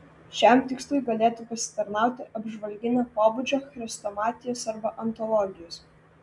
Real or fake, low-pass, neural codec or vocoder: real; 10.8 kHz; none